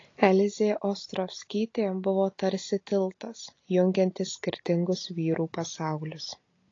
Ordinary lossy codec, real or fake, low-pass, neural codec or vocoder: AAC, 32 kbps; real; 7.2 kHz; none